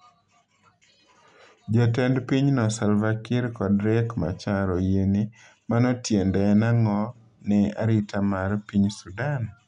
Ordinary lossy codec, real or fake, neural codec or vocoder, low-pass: none; real; none; 10.8 kHz